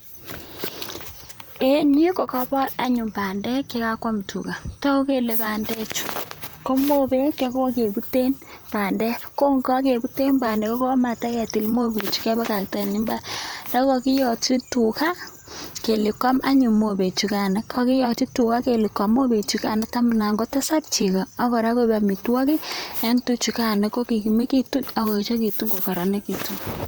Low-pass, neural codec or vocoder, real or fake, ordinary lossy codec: none; vocoder, 44.1 kHz, 128 mel bands, Pupu-Vocoder; fake; none